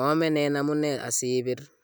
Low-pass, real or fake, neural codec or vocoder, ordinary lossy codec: none; real; none; none